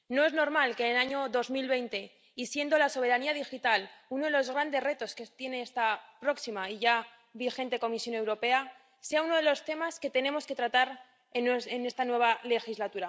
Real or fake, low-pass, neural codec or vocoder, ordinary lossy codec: real; none; none; none